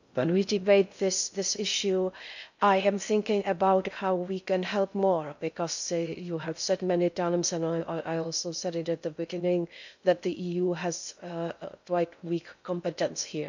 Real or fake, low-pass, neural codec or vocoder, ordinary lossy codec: fake; 7.2 kHz; codec, 16 kHz in and 24 kHz out, 0.6 kbps, FocalCodec, streaming, 2048 codes; none